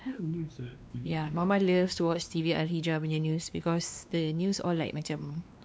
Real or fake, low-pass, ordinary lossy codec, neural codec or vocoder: fake; none; none; codec, 16 kHz, 2 kbps, X-Codec, WavLM features, trained on Multilingual LibriSpeech